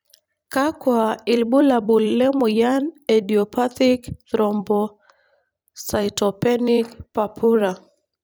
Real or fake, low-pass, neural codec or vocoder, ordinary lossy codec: fake; none; vocoder, 44.1 kHz, 128 mel bands every 256 samples, BigVGAN v2; none